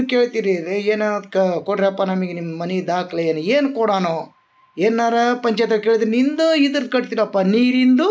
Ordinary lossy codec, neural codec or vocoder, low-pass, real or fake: none; none; none; real